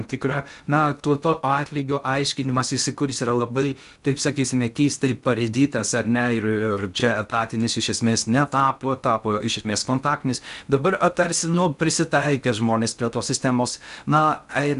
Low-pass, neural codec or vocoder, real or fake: 10.8 kHz; codec, 16 kHz in and 24 kHz out, 0.6 kbps, FocalCodec, streaming, 2048 codes; fake